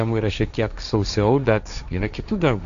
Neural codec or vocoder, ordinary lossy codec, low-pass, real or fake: codec, 16 kHz, 1.1 kbps, Voila-Tokenizer; AAC, 96 kbps; 7.2 kHz; fake